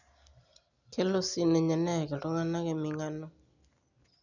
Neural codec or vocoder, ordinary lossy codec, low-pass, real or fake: none; none; 7.2 kHz; real